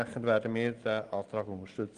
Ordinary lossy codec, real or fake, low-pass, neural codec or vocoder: Opus, 24 kbps; real; 9.9 kHz; none